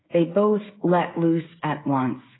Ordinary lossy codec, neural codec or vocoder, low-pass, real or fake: AAC, 16 kbps; codec, 16 kHz, 8 kbps, FreqCodec, smaller model; 7.2 kHz; fake